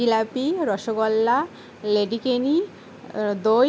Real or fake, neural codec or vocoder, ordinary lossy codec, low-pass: real; none; none; none